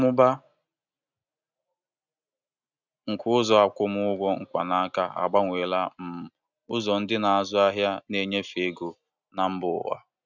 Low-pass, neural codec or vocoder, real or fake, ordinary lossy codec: 7.2 kHz; none; real; none